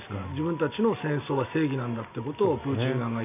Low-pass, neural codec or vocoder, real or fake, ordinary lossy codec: 3.6 kHz; none; real; none